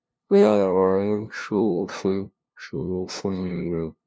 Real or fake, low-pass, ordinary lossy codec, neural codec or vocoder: fake; none; none; codec, 16 kHz, 0.5 kbps, FunCodec, trained on LibriTTS, 25 frames a second